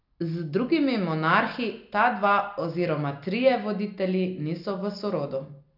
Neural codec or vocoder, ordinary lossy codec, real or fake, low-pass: none; none; real; 5.4 kHz